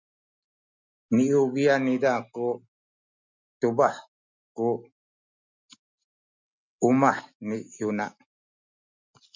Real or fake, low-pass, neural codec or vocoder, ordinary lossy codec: real; 7.2 kHz; none; MP3, 64 kbps